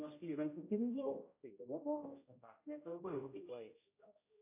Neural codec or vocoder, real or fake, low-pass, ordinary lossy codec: codec, 16 kHz, 0.5 kbps, X-Codec, HuBERT features, trained on general audio; fake; 3.6 kHz; AAC, 24 kbps